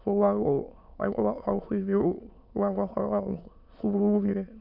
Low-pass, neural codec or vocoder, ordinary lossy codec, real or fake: 5.4 kHz; autoencoder, 22.05 kHz, a latent of 192 numbers a frame, VITS, trained on many speakers; none; fake